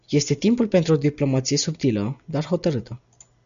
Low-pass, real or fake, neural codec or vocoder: 7.2 kHz; real; none